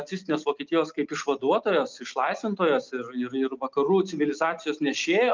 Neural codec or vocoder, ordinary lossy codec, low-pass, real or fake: none; Opus, 24 kbps; 7.2 kHz; real